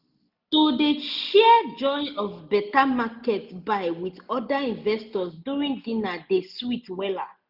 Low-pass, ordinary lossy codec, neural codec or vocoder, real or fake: 5.4 kHz; none; none; real